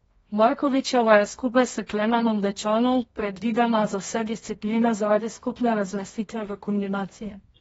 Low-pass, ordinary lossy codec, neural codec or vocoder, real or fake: 10.8 kHz; AAC, 24 kbps; codec, 24 kHz, 0.9 kbps, WavTokenizer, medium music audio release; fake